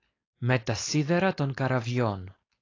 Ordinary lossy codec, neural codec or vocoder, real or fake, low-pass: AAC, 32 kbps; codec, 16 kHz, 4.8 kbps, FACodec; fake; 7.2 kHz